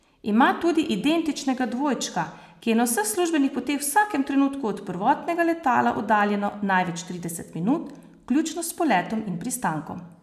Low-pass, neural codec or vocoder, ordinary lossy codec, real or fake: 14.4 kHz; none; none; real